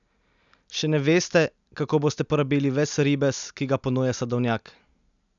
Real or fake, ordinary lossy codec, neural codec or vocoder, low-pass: real; none; none; 7.2 kHz